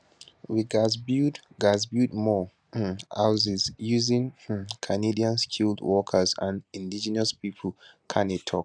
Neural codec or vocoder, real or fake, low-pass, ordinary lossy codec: none; real; 9.9 kHz; none